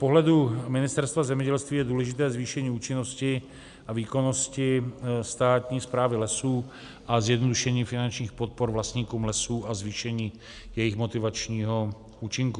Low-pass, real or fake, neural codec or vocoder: 10.8 kHz; real; none